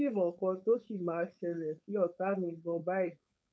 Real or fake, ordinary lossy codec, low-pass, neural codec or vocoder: fake; none; none; codec, 16 kHz, 4.8 kbps, FACodec